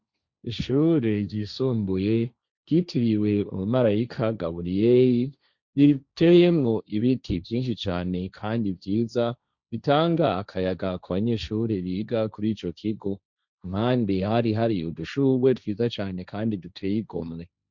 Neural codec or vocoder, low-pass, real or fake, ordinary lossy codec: codec, 16 kHz, 1.1 kbps, Voila-Tokenizer; 7.2 kHz; fake; Opus, 64 kbps